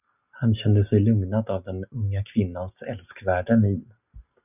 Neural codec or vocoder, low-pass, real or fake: none; 3.6 kHz; real